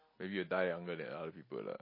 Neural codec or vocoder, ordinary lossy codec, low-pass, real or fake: none; MP3, 24 kbps; 5.4 kHz; real